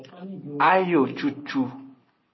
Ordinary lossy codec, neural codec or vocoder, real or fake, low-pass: MP3, 24 kbps; none; real; 7.2 kHz